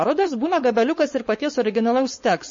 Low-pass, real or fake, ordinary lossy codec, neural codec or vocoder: 7.2 kHz; fake; MP3, 32 kbps; codec, 16 kHz, 4.8 kbps, FACodec